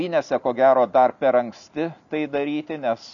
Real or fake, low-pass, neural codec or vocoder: real; 7.2 kHz; none